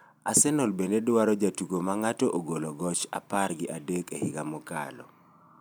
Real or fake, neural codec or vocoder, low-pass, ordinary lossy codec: real; none; none; none